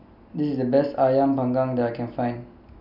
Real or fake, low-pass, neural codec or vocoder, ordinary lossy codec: real; 5.4 kHz; none; none